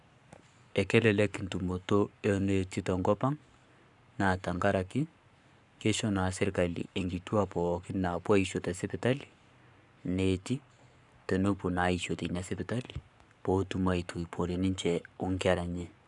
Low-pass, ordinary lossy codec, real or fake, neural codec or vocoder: 10.8 kHz; none; fake; codec, 44.1 kHz, 7.8 kbps, Pupu-Codec